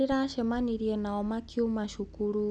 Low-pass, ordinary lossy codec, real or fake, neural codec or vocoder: none; none; real; none